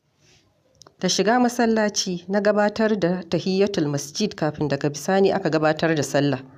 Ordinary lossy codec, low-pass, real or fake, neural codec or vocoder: none; 14.4 kHz; real; none